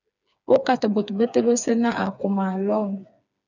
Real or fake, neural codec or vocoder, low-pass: fake; codec, 16 kHz, 4 kbps, FreqCodec, smaller model; 7.2 kHz